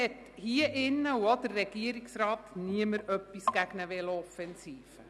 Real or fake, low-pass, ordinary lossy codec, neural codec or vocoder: real; none; none; none